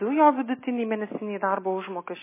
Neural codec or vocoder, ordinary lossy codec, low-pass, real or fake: none; MP3, 16 kbps; 3.6 kHz; real